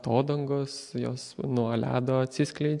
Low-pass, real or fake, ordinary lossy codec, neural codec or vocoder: 10.8 kHz; real; MP3, 64 kbps; none